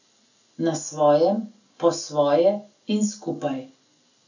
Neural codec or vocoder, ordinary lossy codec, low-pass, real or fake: none; none; 7.2 kHz; real